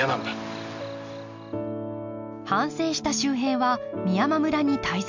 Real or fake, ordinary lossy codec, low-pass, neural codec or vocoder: real; MP3, 64 kbps; 7.2 kHz; none